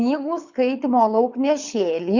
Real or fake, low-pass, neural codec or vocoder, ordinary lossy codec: fake; 7.2 kHz; codec, 24 kHz, 6 kbps, HILCodec; Opus, 64 kbps